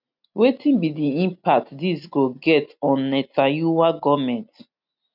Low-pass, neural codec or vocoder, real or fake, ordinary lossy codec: 5.4 kHz; none; real; none